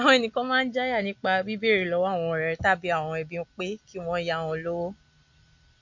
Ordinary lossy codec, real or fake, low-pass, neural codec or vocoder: MP3, 48 kbps; real; 7.2 kHz; none